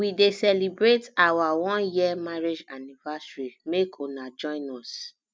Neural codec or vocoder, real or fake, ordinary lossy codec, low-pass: none; real; none; none